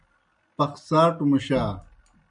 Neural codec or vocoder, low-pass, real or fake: none; 9.9 kHz; real